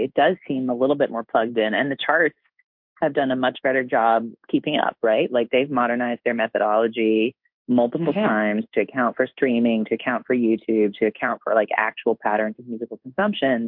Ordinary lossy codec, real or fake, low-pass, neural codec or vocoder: MP3, 48 kbps; fake; 5.4 kHz; codec, 16 kHz in and 24 kHz out, 1 kbps, XY-Tokenizer